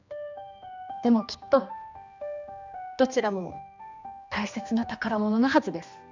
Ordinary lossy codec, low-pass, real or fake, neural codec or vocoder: none; 7.2 kHz; fake; codec, 16 kHz, 2 kbps, X-Codec, HuBERT features, trained on general audio